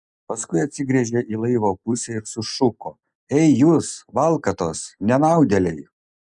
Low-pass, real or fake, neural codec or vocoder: 10.8 kHz; real; none